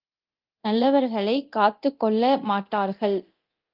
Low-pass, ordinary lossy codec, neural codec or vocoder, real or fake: 5.4 kHz; Opus, 32 kbps; codec, 24 kHz, 0.9 kbps, DualCodec; fake